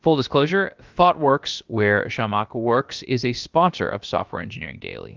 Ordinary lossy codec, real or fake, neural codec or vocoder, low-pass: Opus, 32 kbps; fake; codec, 16 kHz, about 1 kbps, DyCAST, with the encoder's durations; 7.2 kHz